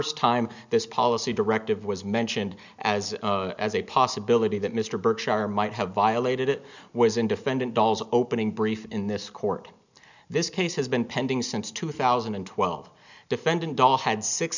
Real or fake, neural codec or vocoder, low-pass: real; none; 7.2 kHz